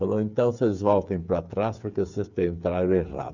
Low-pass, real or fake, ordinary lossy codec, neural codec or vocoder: 7.2 kHz; fake; none; codec, 16 kHz, 8 kbps, FreqCodec, smaller model